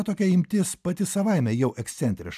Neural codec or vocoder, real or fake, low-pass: none; real; 14.4 kHz